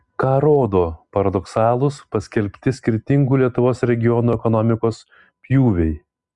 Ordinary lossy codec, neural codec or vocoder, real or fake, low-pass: Opus, 64 kbps; none; real; 10.8 kHz